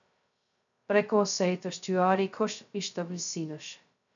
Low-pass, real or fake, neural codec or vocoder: 7.2 kHz; fake; codec, 16 kHz, 0.2 kbps, FocalCodec